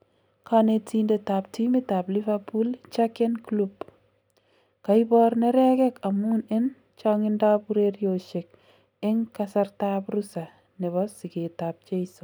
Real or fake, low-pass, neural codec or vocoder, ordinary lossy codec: real; none; none; none